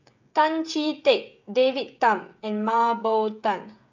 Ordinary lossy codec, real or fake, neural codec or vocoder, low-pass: none; fake; codec, 16 kHz, 16 kbps, FreqCodec, smaller model; 7.2 kHz